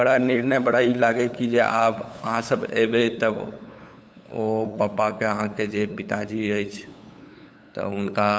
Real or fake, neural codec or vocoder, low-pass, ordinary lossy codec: fake; codec, 16 kHz, 8 kbps, FunCodec, trained on LibriTTS, 25 frames a second; none; none